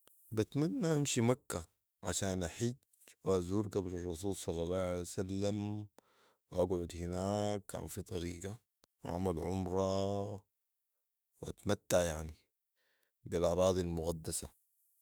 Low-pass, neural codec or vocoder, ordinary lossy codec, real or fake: none; autoencoder, 48 kHz, 32 numbers a frame, DAC-VAE, trained on Japanese speech; none; fake